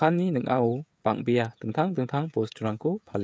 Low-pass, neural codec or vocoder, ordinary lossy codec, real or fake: none; codec, 16 kHz, 16 kbps, FreqCodec, smaller model; none; fake